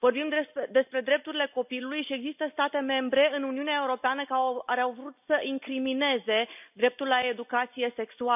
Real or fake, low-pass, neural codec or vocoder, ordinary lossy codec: real; 3.6 kHz; none; none